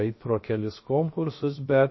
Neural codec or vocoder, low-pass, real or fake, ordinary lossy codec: codec, 16 kHz, 0.7 kbps, FocalCodec; 7.2 kHz; fake; MP3, 24 kbps